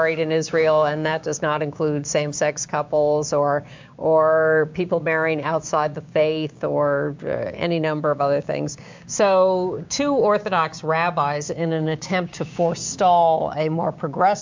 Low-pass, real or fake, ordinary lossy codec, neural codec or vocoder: 7.2 kHz; fake; MP3, 64 kbps; codec, 16 kHz, 6 kbps, DAC